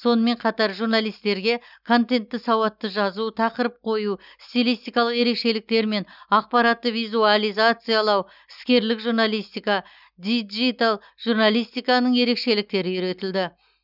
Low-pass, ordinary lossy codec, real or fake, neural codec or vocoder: 5.4 kHz; none; real; none